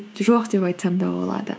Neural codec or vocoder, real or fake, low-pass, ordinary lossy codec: codec, 16 kHz, 6 kbps, DAC; fake; none; none